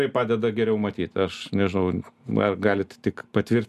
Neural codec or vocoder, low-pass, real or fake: none; 14.4 kHz; real